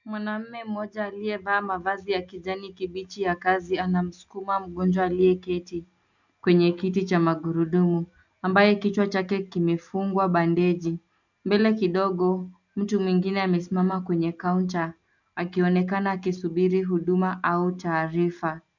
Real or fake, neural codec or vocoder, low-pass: real; none; 7.2 kHz